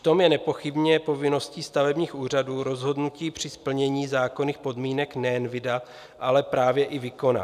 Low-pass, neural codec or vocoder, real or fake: 14.4 kHz; none; real